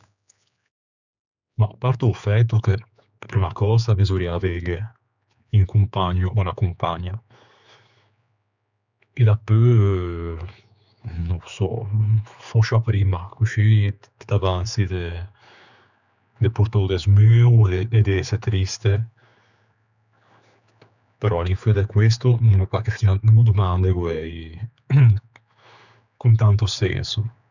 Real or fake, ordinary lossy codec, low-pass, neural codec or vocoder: fake; none; 7.2 kHz; codec, 16 kHz, 4 kbps, X-Codec, HuBERT features, trained on general audio